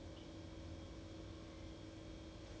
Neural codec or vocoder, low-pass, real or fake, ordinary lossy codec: none; none; real; none